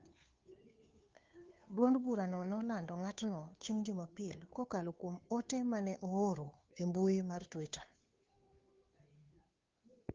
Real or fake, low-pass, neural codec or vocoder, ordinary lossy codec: fake; 7.2 kHz; codec, 16 kHz, 2 kbps, FunCodec, trained on Chinese and English, 25 frames a second; Opus, 24 kbps